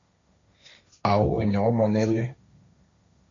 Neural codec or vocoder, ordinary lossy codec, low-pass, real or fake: codec, 16 kHz, 1.1 kbps, Voila-Tokenizer; MP3, 64 kbps; 7.2 kHz; fake